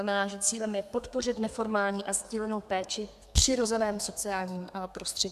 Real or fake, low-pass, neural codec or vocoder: fake; 14.4 kHz; codec, 32 kHz, 1.9 kbps, SNAC